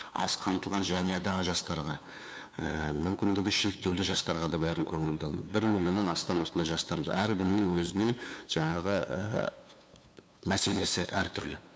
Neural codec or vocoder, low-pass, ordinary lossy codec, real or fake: codec, 16 kHz, 2 kbps, FunCodec, trained on LibriTTS, 25 frames a second; none; none; fake